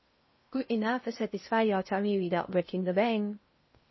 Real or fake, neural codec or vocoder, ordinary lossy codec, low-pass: fake; codec, 16 kHz in and 24 kHz out, 0.6 kbps, FocalCodec, streaming, 4096 codes; MP3, 24 kbps; 7.2 kHz